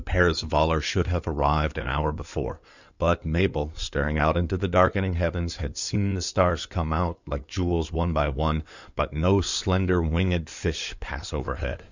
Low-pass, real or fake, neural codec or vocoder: 7.2 kHz; fake; codec, 16 kHz in and 24 kHz out, 2.2 kbps, FireRedTTS-2 codec